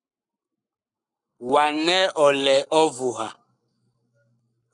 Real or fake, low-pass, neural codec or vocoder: fake; 10.8 kHz; codec, 44.1 kHz, 7.8 kbps, Pupu-Codec